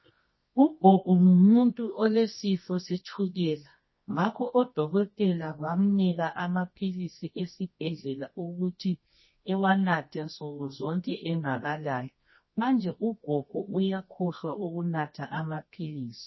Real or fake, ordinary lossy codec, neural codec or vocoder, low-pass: fake; MP3, 24 kbps; codec, 24 kHz, 0.9 kbps, WavTokenizer, medium music audio release; 7.2 kHz